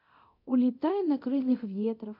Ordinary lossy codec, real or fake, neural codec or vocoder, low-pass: MP3, 48 kbps; fake; codec, 24 kHz, 0.9 kbps, DualCodec; 5.4 kHz